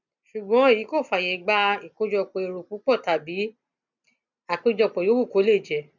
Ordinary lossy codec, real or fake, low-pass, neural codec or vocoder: none; real; 7.2 kHz; none